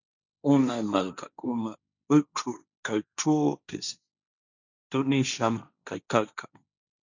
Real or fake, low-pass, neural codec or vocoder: fake; 7.2 kHz; codec, 16 kHz, 1.1 kbps, Voila-Tokenizer